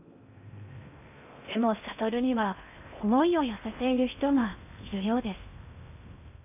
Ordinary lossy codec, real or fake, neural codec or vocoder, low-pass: none; fake; codec, 16 kHz in and 24 kHz out, 0.6 kbps, FocalCodec, streaming, 2048 codes; 3.6 kHz